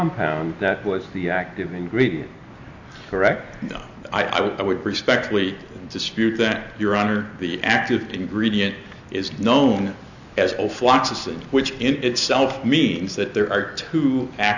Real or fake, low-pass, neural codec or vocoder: real; 7.2 kHz; none